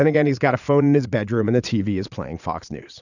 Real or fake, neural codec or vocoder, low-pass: fake; vocoder, 22.05 kHz, 80 mel bands, Vocos; 7.2 kHz